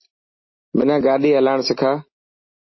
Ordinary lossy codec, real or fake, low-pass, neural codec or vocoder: MP3, 24 kbps; real; 7.2 kHz; none